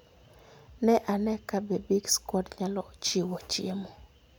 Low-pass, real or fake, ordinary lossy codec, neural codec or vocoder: none; real; none; none